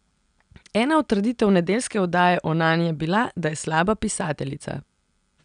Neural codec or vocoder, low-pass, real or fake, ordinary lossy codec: none; 9.9 kHz; real; none